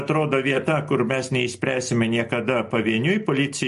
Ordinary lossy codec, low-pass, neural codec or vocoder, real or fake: MP3, 48 kbps; 14.4 kHz; none; real